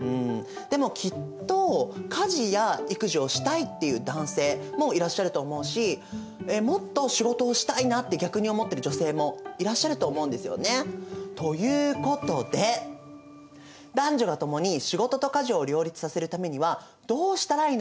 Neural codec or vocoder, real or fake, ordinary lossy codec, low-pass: none; real; none; none